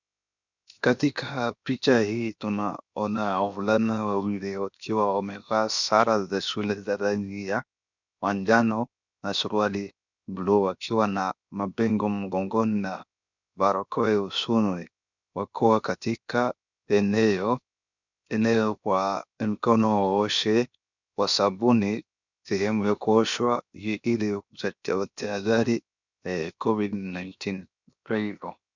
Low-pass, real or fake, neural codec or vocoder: 7.2 kHz; fake; codec, 16 kHz, 0.7 kbps, FocalCodec